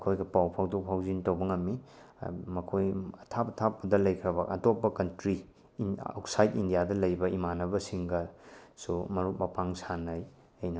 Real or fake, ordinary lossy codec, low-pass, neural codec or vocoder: real; none; none; none